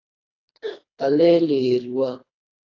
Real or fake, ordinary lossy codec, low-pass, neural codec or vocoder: fake; AAC, 32 kbps; 7.2 kHz; codec, 24 kHz, 3 kbps, HILCodec